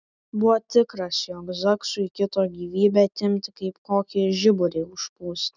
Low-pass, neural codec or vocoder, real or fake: 7.2 kHz; none; real